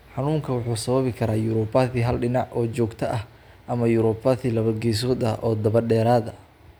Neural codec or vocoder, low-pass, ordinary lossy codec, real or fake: none; none; none; real